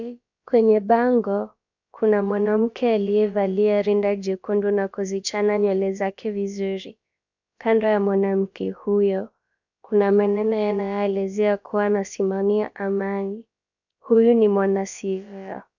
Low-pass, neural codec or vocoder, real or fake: 7.2 kHz; codec, 16 kHz, about 1 kbps, DyCAST, with the encoder's durations; fake